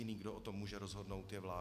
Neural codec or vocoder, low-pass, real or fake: none; 14.4 kHz; real